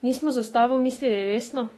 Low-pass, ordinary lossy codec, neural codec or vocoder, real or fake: 19.8 kHz; AAC, 32 kbps; autoencoder, 48 kHz, 32 numbers a frame, DAC-VAE, trained on Japanese speech; fake